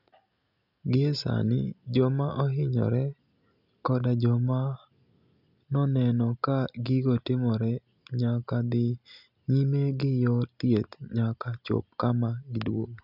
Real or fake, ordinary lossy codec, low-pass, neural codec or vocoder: real; none; 5.4 kHz; none